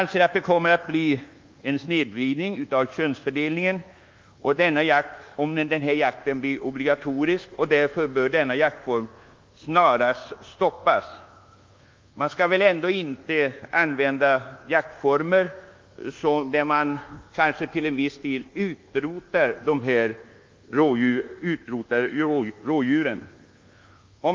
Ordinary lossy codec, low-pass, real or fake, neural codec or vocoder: Opus, 32 kbps; 7.2 kHz; fake; codec, 24 kHz, 1.2 kbps, DualCodec